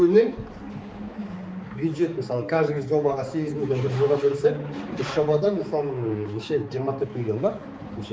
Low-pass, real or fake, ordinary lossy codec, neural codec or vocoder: none; fake; none; codec, 16 kHz, 4 kbps, X-Codec, HuBERT features, trained on balanced general audio